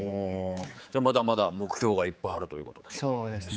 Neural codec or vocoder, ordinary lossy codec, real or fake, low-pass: codec, 16 kHz, 4 kbps, X-Codec, HuBERT features, trained on general audio; none; fake; none